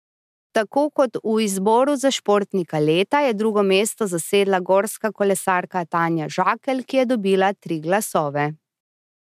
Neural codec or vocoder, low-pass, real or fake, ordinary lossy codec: none; 14.4 kHz; real; MP3, 96 kbps